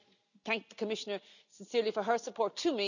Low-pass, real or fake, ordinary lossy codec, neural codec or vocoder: 7.2 kHz; real; none; none